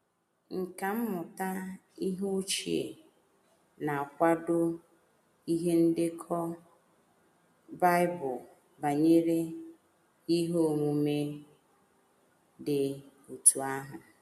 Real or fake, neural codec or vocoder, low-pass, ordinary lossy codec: real; none; 14.4 kHz; MP3, 96 kbps